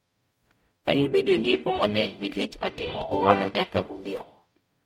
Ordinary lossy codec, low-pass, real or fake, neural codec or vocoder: MP3, 64 kbps; 19.8 kHz; fake; codec, 44.1 kHz, 0.9 kbps, DAC